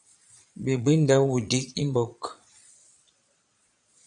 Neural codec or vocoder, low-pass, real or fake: vocoder, 22.05 kHz, 80 mel bands, Vocos; 9.9 kHz; fake